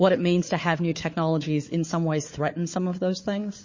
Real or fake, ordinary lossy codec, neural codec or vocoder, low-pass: fake; MP3, 32 kbps; codec, 16 kHz, 4 kbps, FunCodec, trained on Chinese and English, 50 frames a second; 7.2 kHz